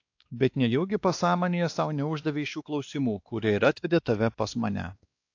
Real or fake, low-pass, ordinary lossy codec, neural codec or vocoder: fake; 7.2 kHz; AAC, 48 kbps; codec, 16 kHz, 2 kbps, X-Codec, WavLM features, trained on Multilingual LibriSpeech